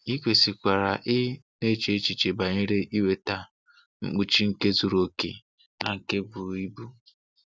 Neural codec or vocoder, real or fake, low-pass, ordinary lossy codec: none; real; none; none